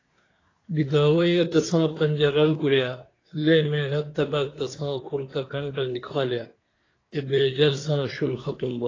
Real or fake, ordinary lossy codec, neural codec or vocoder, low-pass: fake; AAC, 32 kbps; codec, 24 kHz, 1 kbps, SNAC; 7.2 kHz